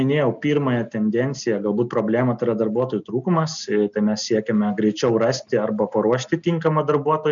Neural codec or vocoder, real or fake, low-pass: none; real; 7.2 kHz